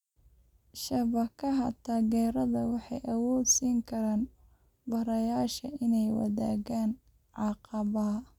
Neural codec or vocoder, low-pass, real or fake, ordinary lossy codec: none; 19.8 kHz; real; none